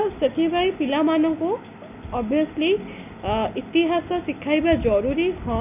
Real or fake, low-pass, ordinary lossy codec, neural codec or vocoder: real; 3.6 kHz; none; none